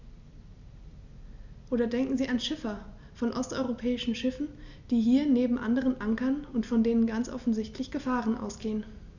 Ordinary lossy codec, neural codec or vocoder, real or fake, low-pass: none; none; real; 7.2 kHz